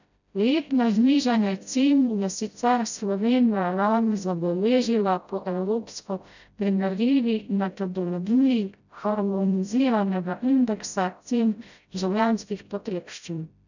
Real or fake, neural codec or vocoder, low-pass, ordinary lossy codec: fake; codec, 16 kHz, 0.5 kbps, FreqCodec, smaller model; 7.2 kHz; none